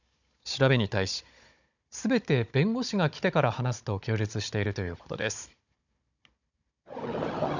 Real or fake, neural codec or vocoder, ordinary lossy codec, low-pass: fake; codec, 16 kHz, 16 kbps, FunCodec, trained on Chinese and English, 50 frames a second; none; 7.2 kHz